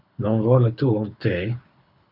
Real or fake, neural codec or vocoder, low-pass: fake; codec, 24 kHz, 6 kbps, HILCodec; 5.4 kHz